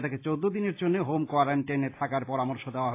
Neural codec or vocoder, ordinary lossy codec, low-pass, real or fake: none; AAC, 24 kbps; 3.6 kHz; real